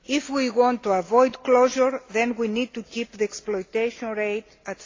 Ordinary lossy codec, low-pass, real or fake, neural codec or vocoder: AAC, 32 kbps; 7.2 kHz; real; none